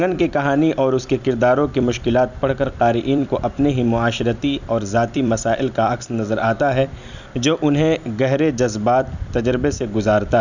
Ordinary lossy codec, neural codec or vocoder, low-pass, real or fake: none; vocoder, 44.1 kHz, 128 mel bands every 256 samples, BigVGAN v2; 7.2 kHz; fake